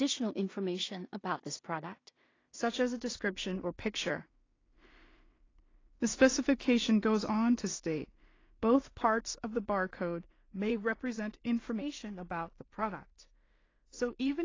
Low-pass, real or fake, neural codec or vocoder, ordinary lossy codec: 7.2 kHz; fake; codec, 16 kHz in and 24 kHz out, 0.4 kbps, LongCat-Audio-Codec, two codebook decoder; AAC, 32 kbps